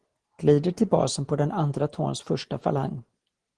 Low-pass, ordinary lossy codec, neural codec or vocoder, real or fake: 9.9 kHz; Opus, 16 kbps; none; real